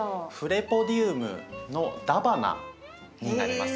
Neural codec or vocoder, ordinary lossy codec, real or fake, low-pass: none; none; real; none